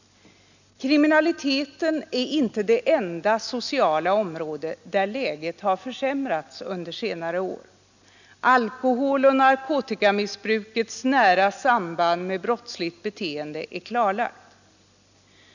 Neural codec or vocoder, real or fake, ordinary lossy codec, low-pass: none; real; none; 7.2 kHz